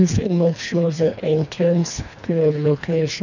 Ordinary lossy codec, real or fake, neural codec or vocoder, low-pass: none; fake; codec, 24 kHz, 1.5 kbps, HILCodec; 7.2 kHz